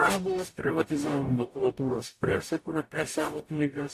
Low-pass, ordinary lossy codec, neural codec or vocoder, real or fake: 14.4 kHz; AAC, 64 kbps; codec, 44.1 kHz, 0.9 kbps, DAC; fake